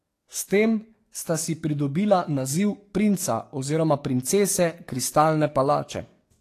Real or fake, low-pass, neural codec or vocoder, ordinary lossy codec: fake; 14.4 kHz; codec, 44.1 kHz, 7.8 kbps, DAC; AAC, 48 kbps